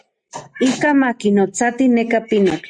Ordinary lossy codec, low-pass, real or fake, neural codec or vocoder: Opus, 64 kbps; 9.9 kHz; real; none